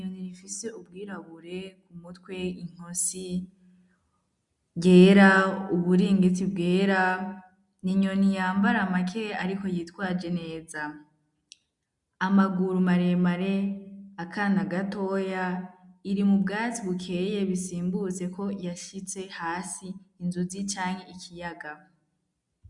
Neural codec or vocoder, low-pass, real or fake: none; 10.8 kHz; real